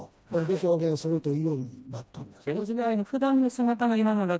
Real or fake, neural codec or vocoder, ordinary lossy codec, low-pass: fake; codec, 16 kHz, 1 kbps, FreqCodec, smaller model; none; none